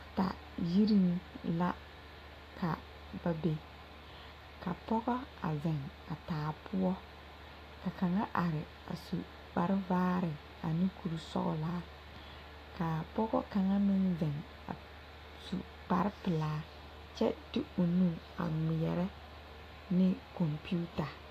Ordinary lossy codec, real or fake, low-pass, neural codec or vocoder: AAC, 48 kbps; real; 14.4 kHz; none